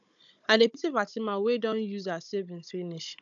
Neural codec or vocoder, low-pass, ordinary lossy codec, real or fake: codec, 16 kHz, 16 kbps, FunCodec, trained on Chinese and English, 50 frames a second; 7.2 kHz; none; fake